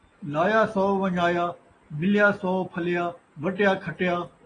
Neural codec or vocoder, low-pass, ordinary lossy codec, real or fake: none; 9.9 kHz; AAC, 32 kbps; real